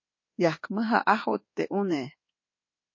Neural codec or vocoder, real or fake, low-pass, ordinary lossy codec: codec, 24 kHz, 1.2 kbps, DualCodec; fake; 7.2 kHz; MP3, 32 kbps